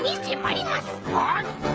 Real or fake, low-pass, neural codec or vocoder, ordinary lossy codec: fake; none; codec, 16 kHz, 16 kbps, FreqCodec, smaller model; none